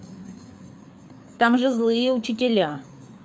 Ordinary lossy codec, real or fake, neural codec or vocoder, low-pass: none; fake; codec, 16 kHz, 4 kbps, FreqCodec, larger model; none